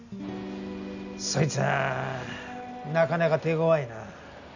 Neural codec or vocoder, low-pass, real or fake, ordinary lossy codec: none; 7.2 kHz; real; none